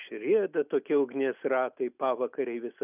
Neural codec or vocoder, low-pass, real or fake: none; 3.6 kHz; real